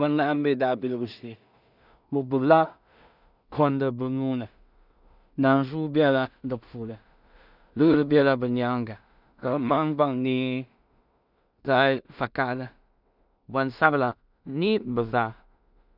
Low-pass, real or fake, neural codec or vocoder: 5.4 kHz; fake; codec, 16 kHz in and 24 kHz out, 0.4 kbps, LongCat-Audio-Codec, two codebook decoder